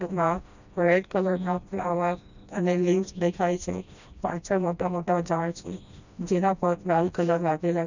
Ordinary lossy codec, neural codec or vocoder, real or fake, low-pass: none; codec, 16 kHz, 1 kbps, FreqCodec, smaller model; fake; 7.2 kHz